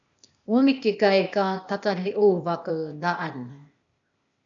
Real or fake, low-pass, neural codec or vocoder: fake; 7.2 kHz; codec, 16 kHz, 0.8 kbps, ZipCodec